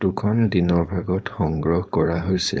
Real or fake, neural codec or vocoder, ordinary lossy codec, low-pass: fake; codec, 16 kHz, 4.8 kbps, FACodec; none; none